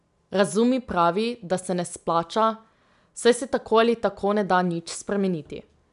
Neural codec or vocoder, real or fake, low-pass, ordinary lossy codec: none; real; 10.8 kHz; none